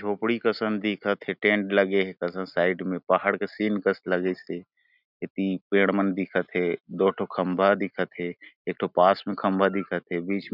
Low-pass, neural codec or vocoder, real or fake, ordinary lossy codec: 5.4 kHz; none; real; none